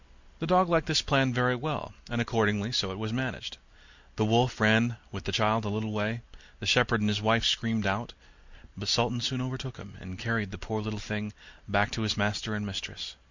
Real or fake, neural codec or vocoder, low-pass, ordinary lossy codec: real; none; 7.2 kHz; Opus, 64 kbps